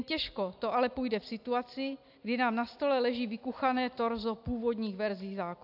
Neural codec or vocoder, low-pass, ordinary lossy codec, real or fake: none; 5.4 kHz; AAC, 48 kbps; real